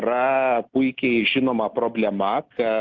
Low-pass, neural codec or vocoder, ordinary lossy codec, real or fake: 7.2 kHz; none; Opus, 32 kbps; real